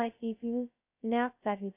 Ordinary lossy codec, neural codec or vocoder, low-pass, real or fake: AAC, 32 kbps; codec, 16 kHz, 0.2 kbps, FocalCodec; 3.6 kHz; fake